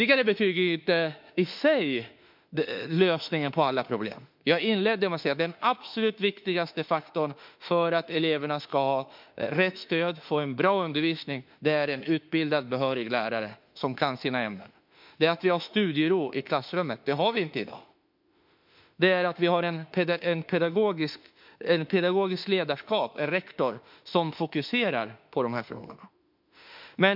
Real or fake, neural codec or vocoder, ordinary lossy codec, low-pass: fake; autoencoder, 48 kHz, 32 numbers a frame, DAC-VAE, trained on Japanese speech; MP3, 48 kbps; 5.4 kHz